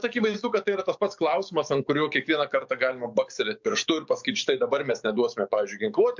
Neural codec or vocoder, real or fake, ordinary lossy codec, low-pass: codec, 44.1 kHz, 7.8 kbps, DAC; fake; MP3, 64 kbps; 7.2 kHz